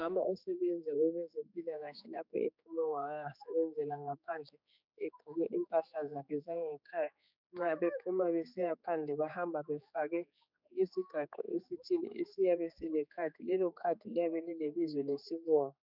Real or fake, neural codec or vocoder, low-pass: fake; codec, 16 kHz, 4 kbps, X-Codec, HuBERT features, trained on general audio; 5.4 kHz